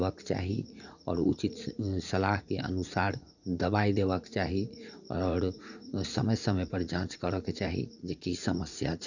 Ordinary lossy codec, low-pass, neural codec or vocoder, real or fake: none; 7.2 kHz; vocoder, 44.1 kHz, 80 mel bands, Vocos; fake